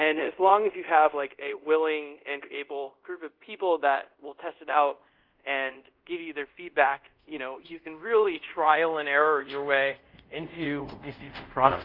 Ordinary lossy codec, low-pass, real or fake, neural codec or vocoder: Opus, 32 kbps; 5.4 kHz; fake; codec, 24 kHz, 0.5 kbps, DualCodec